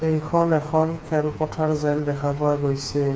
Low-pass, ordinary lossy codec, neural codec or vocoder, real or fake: none; none; codec, 16 kHz, 4 kbps, FreqCodec, smaller model; fake